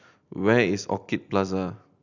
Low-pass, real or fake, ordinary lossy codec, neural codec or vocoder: 7.2 kHz; real; none; none